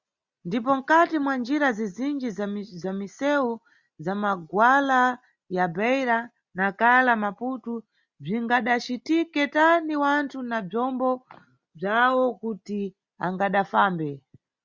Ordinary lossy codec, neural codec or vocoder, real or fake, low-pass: Opus, 64 kbps; none; real; 7.2 kHz